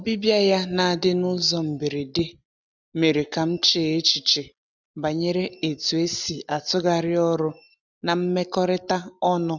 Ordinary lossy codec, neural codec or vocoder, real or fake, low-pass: none; none; real; 7.2 kHz